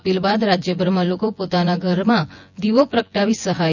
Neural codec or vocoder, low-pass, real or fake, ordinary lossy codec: vocoder, 24 kHz, 100 mel bands, Vocos; 7.2 kHz; fake; none